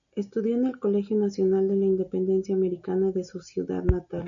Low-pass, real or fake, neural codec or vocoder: 7.2 kHz; real; none